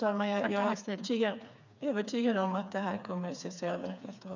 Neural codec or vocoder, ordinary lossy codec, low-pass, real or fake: codec, 16 kHz, 4 kbps, FreqCodec, smaller model; none; 7.2 kHz; fake